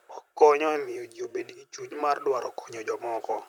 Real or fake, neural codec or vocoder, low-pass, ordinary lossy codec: fake; vocoder, 44.1 kHz, 128 mel bands, Pupu-Vocoder; 19.8 kHz; none